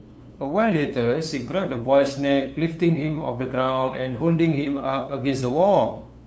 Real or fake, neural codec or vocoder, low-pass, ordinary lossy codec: fake; codec, 16 kHz, 2 kbps, FunCodec, trained on LibriTTS, 25 frames a second; none; none